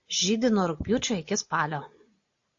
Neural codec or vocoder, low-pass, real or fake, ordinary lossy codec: none; 7.2 kHz; real; AAC, 48 kbps